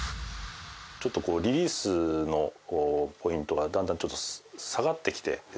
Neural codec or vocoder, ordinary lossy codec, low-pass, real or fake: none; none; none; real